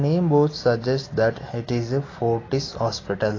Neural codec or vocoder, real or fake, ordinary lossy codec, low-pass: none; real; AAC, 32 kbps; 7.2 kHz